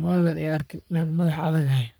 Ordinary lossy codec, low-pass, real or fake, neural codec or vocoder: none; none; fake; codec, 44.1 kHz, 3.4 kbps, Pupu-Codec